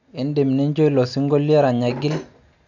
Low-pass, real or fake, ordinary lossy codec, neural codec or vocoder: 7.2 kHz; real; none; none